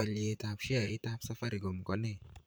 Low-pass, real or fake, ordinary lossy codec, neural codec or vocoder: none; fake; none; vocoder, 44.1 kHz, 128 mel bands, Pupu-Vocoder